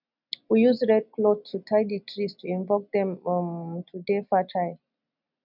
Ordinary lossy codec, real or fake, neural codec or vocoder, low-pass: none; real; none; 5.4 kHz